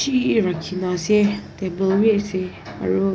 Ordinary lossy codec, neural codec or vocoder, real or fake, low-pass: none; none; real; none